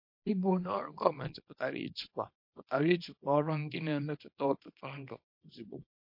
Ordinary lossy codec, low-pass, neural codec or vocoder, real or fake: MP3, 32 kbps; 5.4 kHz; codec, 24 kHz, 0.9 kbps, WavTokenizer, small release; fake